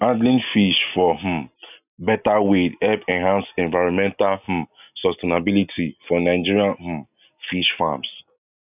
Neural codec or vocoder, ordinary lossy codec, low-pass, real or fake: none; none; 3.6 kHz; real